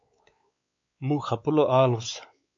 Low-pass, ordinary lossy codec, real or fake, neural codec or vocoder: 7.2 kHz; MP3, 48 kbps; fake; codec, 16 kHz, 4 kbps, X-Codec, WavLM features, trained on Multilingual LibriSpeech